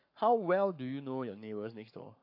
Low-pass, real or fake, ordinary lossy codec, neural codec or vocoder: 5.4 kHz; fake; AAC, 32 kbps; codec, 44.1 kHz, 7.8 kbps, Pupu-Codec